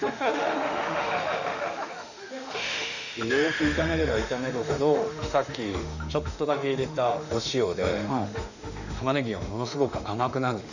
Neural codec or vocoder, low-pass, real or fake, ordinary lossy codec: autoencoder, 48 kHz, 32 numbers a frame, DAC-VAE, trained on Japanese speech; 7.2 kHz; fake; none